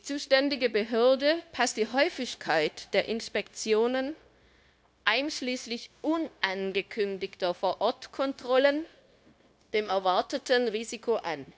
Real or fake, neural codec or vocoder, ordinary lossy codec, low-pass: fake; codec, 16 kHz, 0.9 kbps, LongCat-Audio-Codec; none; none